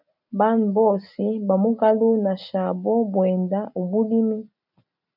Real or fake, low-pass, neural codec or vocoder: real; 5.4 kHz; none